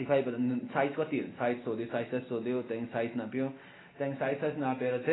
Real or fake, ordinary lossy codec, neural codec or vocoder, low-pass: real; AAC, 16 kbps; none; 7.2 kHz